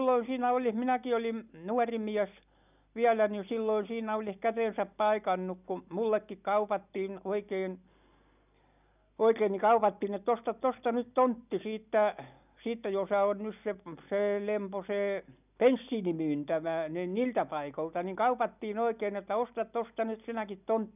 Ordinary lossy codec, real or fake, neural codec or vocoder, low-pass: none; real; none; 3.6 kHz